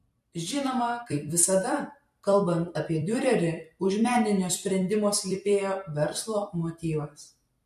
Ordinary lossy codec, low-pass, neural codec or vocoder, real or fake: MP3, 64 kbps; 14.4 kHz; vocoder, 44.1 kHz, 128 mel bands every 256 samples, BigVGAN v2; fake